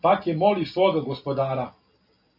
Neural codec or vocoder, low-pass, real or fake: none; 5.4 kHz; real